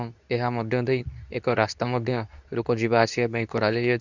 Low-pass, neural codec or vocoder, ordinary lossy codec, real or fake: 7.2 kHz; codec, 24 kHz, 0.9 kbps, WavTokenizer, medium speech release version 2; none; fake